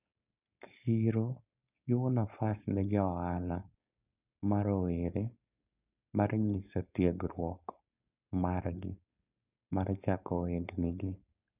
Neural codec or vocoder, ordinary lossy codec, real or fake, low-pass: codec, 16 kHz, 4.8 kbps, FACodec; none; fake; 3.6 kHz